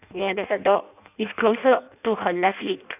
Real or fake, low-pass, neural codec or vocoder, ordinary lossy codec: fake; 3.6 kHz; codec, 16 kHz in and 24 kHz out, 0.6 kbps, FireRedTTS-2 codec; none